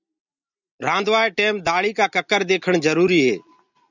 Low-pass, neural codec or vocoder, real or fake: 7.2 kHz; none; real